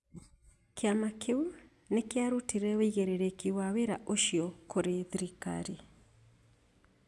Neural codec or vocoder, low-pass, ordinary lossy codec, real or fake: none; none; none; real